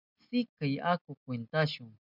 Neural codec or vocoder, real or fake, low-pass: none; real; 5.4 kHz